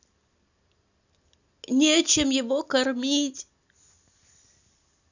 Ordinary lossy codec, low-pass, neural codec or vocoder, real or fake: none; 7.2 kHz; none; real